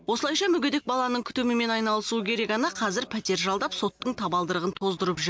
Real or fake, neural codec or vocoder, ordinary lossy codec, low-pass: real; none; none; none